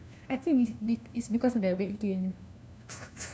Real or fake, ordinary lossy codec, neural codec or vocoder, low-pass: fake; none; codec, 16 kHz, 1 kbps, FunCodec, trained on LibriTTS, 50 frames a second; none